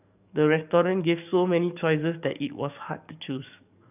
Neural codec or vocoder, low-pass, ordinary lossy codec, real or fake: codec, 44.1 kHz, 7.8 kbps, DAC; 3.6 kHz; none; fake